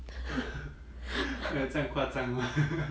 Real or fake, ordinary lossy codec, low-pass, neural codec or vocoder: real; none; none; none